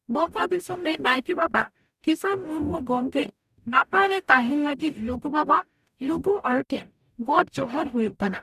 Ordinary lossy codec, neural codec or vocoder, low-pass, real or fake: none; codec, 44.1 kHz, 0.9 kbps, DAC; 14.4 kHz; fake